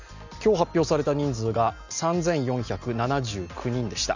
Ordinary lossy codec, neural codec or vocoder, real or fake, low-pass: none; none; real; 7.2 kHz